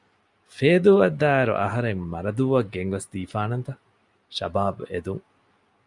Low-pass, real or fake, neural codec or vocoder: 10.8 kHz; real; none